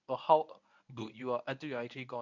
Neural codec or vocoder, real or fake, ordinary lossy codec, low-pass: codec, 24 kHz, 0.9 kbps, WavTokenizer, medium speech release version 1; fake; none; 7.2 kHz